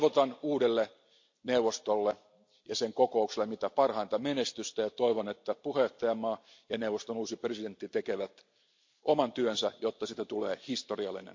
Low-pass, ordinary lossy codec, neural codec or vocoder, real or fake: 7.2 kHz; MP3, 48 kbps; none; real